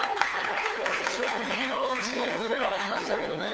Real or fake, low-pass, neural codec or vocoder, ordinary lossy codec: fake; none; codec, 16 kHz, 4 kbps, FunCodec, trained on LibriTTS, 50 frames a second; none